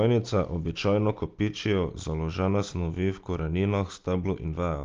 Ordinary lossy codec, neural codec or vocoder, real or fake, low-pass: Opus, 24 kbps; none; real; 7.2 kHz